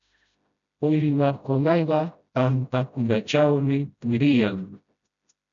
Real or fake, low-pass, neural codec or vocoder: fake; 7.2 kHz; codec, 16 kHz, 0.5 kbps, FreqCodec, smaller model